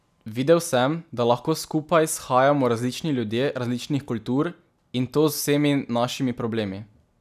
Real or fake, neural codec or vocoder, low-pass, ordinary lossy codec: real; none; 14.4 kHz; none